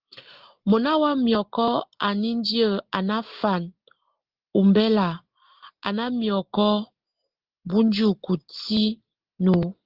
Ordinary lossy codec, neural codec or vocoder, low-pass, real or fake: Opus, 16 kbps; none; 5.4 kHz; real